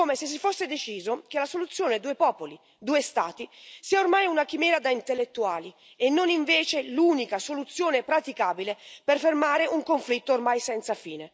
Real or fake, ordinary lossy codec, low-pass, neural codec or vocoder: real; none; none; none